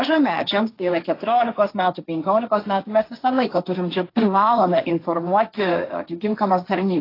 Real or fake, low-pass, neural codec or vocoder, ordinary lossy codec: fake; 5.4 kHz; codec, 16 kHz, 1.1 kbps, Voila-Tokenizer; AAC, 32 kbps